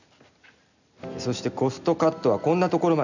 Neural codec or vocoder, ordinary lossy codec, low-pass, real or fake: none; none; 7.2 kHz; real